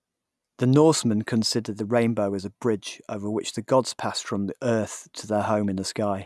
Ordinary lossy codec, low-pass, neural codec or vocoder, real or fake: none; none; none; real